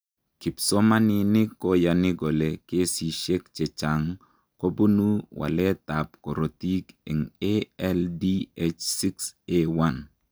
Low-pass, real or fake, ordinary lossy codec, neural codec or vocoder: none; real; none; none